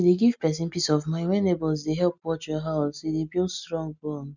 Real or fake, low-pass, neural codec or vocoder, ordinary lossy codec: real; 7.2 kHz; none; none